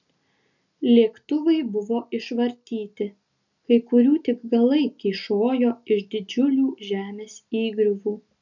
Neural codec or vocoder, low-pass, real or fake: none; 7.2 kHz; real